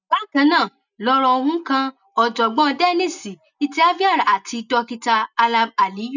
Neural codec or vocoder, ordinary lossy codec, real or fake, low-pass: none; none; real; 7.2 kHz